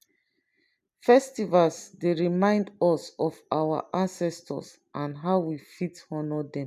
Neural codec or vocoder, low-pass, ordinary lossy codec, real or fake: none; 14.4 kHz; none; real